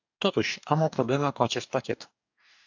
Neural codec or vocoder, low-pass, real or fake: codec, 44.1 kHz, 2.6 kbps, DAC; 7.2 kHz; fake